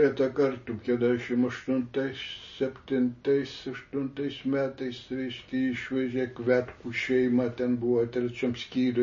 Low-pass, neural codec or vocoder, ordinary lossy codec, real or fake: 7.2 kHz; none; MP3, 32 kbps; real